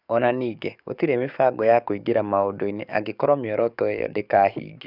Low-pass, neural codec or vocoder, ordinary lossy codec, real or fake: 5.4 kHz; codec, 44.1 kHz, 7.8 kbps, DAC; none; fake